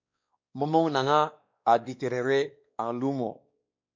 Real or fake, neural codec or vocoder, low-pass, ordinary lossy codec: fake; codec, 16 kHz, 2 kbps, X-Codec, WavLM features, trained on Multilingual LibriSpeech; 7.2 kHz; MP3, 64 kbps